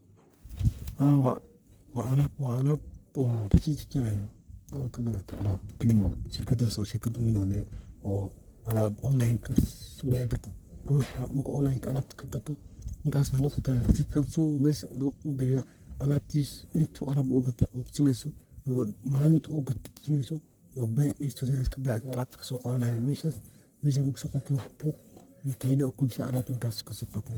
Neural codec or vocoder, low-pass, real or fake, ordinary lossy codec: codec, 44.1 kHz, 1.7 kbps, Pupu-Codec; none; fake; none